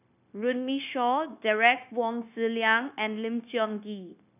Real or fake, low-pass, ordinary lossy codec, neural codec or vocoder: fake; 3.6 kHz; none; codec, 16 kHz, 0.9 kbps, LongCat-Audio-Codec